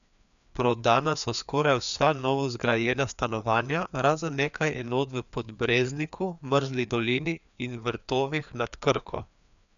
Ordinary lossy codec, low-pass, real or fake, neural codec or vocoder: none; 7.2 kHz; fake; codec, 16 kHz, 2 kbps, FreqCodec, larger model